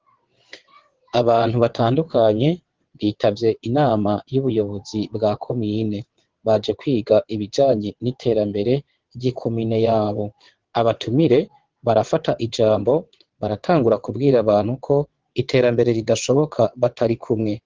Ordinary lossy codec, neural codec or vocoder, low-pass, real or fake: Opus, 16 kbps; vocoder, 22.05 kHz, 80 mel bands, WaveNeXt; 7.2 kHz; fake